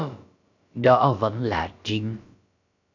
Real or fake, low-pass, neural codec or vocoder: fake; 7.2 kHz; codec, 16 kHz, about 1 kbps, DyCAST, with the encoder's durations